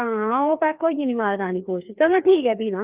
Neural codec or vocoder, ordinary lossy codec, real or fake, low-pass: codec, 16 kHz, 2 kbps, FreqCodec, larger model; Opus, 32 kbps; fake; 3.6 kHz